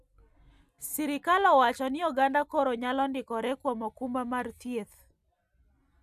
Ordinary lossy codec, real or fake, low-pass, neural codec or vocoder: none; real; 14.4 kHz; none